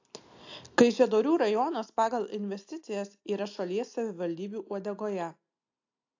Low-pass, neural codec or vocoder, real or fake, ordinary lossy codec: 7.2 kHz; none; real; AAC, 48 kbps